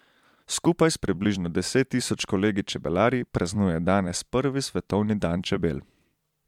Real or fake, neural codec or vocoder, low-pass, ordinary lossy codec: fake; vocoder, 44.1 kHz, 128 mel bands every 256 samples, BigVGAN v2; 19.8 kHz; MP3, 96 kbps